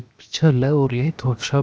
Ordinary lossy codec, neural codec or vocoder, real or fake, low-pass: none; codec, 16 kHz, 0.7 kbps, FocalCodec; fake; none